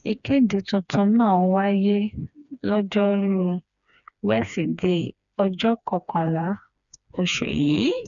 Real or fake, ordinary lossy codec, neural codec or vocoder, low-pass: fake; none; codec, 16 kHz, 2 kbps, FreqCodec, smaller model; 7.2 kHz